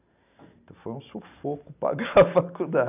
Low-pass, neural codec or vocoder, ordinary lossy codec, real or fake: 3.6 kHz; none; none; real